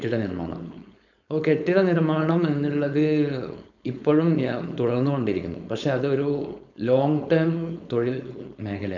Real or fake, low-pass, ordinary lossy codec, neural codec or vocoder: fake; 7.2 kHz; none; codec, 16 kHz, 4.8 kbps, FACodec